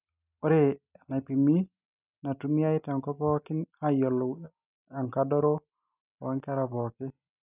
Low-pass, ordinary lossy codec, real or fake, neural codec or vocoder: 3.6 kHz; none; real; none